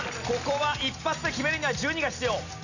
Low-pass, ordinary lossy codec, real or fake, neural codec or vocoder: 7.2 kHz; none; real; none